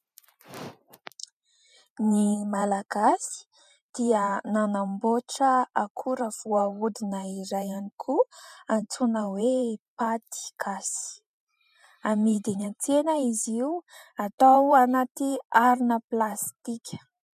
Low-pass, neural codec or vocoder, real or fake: 14.4 kHz; vocoder, 44.1 kHz, 128 mel bands every 512 samples, BigVGAN v2; fake